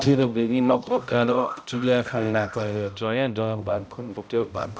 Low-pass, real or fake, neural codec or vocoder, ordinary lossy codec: none; fake; codec, 16 kHz, 0.5 kbps, X-Codec, HuBERT features, trained on balanced general audio; none